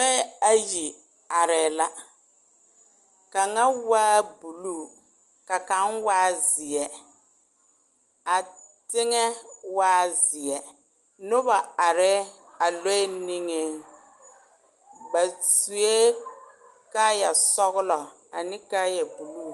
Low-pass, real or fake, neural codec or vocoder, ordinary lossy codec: 10.8 kHz; real; none; Opus, 24 kbps